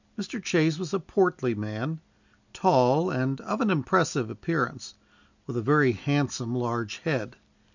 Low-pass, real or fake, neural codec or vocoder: 7.2 kHz; real; none